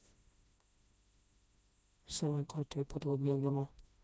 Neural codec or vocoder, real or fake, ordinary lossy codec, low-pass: codec, 16 kHz, 1 kbps, FreqCodec, smaller model; fake; none; none